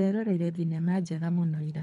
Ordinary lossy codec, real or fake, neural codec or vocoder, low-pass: none; fake; codec, 24 kHz, 3 kbps, HILCodec; 10.8 kHz